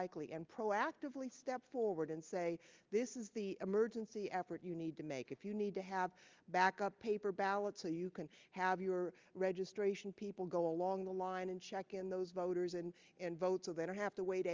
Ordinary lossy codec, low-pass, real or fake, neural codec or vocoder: Opus, 32 kbps; 7.2 kHz; real; none